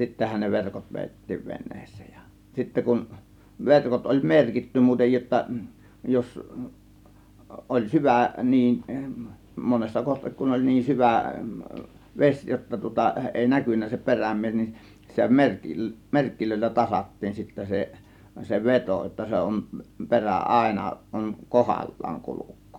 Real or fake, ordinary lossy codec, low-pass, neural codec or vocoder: real; none; 19.8 kHz; none